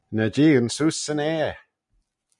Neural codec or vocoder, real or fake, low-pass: none; real; 10.8 kHz